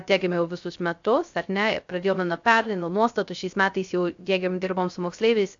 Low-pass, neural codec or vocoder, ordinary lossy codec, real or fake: 7.2 kHz; codec, 16 kHz, 0.3 kbps, FocalCodec; AAC, 64 kbps; fake